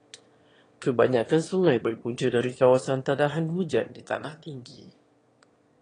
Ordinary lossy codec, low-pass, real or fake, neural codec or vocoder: AAC, 32 kbps; 9.9 kHz; fake; autoencoder, 22.05 kHz, a latent of 192 numbers a frame, VITS, trained on one speaker